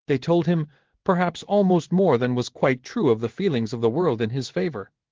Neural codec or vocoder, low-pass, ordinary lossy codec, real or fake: codec, 16 kHz in and 24 kHz out, 1 kbps, XY-Tokenizer; 7.2 kHz; Opus, 16 kbps; fake